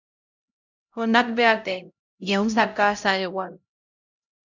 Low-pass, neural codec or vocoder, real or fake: 7.2 kHz; codec, 16 kHz, 0.5 kbps, X-Codec, HuBERT features, trained on LibriSpeech; fake